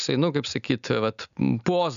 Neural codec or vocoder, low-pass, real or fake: none; 7.2 kHz; real